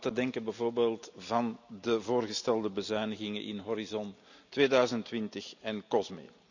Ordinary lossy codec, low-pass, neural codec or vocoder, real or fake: none; 7.2 kHz; none; real